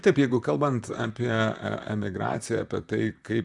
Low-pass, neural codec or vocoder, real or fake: 10.8 kHz; vocoder, 44.1 kHz, 128 mel bands, Pupu-Vocoder; fake